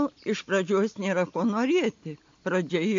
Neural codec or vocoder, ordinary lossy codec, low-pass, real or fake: none; AAC, 48 kbps; 7.2 kHz; real